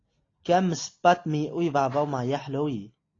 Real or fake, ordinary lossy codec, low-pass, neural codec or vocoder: real; AAC, 32 kbps; 7.2 kHz; none